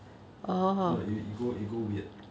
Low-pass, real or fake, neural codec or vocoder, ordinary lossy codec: none; real; none; none